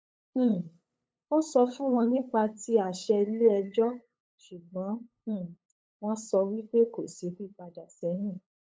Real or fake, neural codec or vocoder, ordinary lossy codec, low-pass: fake; codec, 16 kHz, 8 kbps, FunCodec, trained on LibriTTS, 25 frames a second; none; none